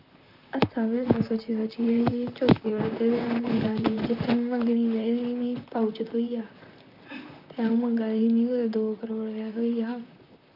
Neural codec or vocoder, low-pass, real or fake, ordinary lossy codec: vocoder, 44.1 kHz, 128 mel bands, Pupu-Vocoder; 5.4 kHz; fake; none